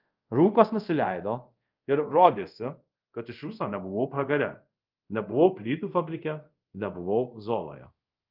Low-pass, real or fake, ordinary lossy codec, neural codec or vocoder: 5.4 kHz; fake; Opus, 24 kbps; codec, 24 kHz, 0.5 kbps, DualCodec